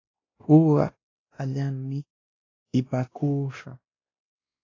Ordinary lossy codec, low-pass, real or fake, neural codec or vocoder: AAC, 32 kbps; 7.2 kHz; fake; codec, 16 kHz, 1 kbps, X-Codec, WavLM features, trained on Multilingual LibriSpeech